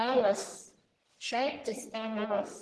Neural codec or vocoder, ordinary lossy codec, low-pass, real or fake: codec, 44.1 kHz, 1.7 kbps, Pupu-Codec; Opus, 16 kbps; 10.8 kHz; fake